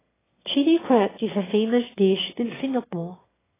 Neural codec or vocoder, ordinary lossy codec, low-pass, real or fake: autoencoder, 22.05 kHz, a latent of 192 numbers a frame, VITS, trained on one speaker; AAC, 16 kbps; 3.6 kHz; fake